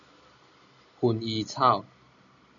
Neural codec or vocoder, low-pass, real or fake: none; 7.2 kHz; real